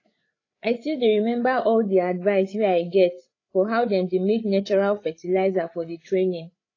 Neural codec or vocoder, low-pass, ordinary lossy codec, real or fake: codec, 16 kHz, 8 kbps, FreqCodec, larger model; 7.2 kHz; AAC, 32 kbps; fake